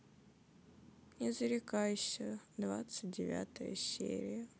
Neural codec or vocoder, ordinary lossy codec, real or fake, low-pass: none; none; real; none